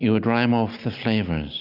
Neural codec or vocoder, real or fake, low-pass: none; real; 5.4 kHz